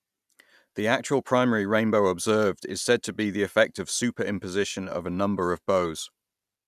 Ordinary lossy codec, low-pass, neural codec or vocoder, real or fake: none; 14.4 kHz; none; real